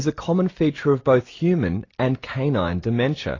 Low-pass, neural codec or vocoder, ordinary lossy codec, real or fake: 7.2 kHz; none; AAC, 32 kbps; real